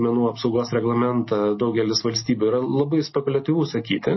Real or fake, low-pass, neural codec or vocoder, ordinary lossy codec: real; 7.2 kHz; none; MP3, 24 kbps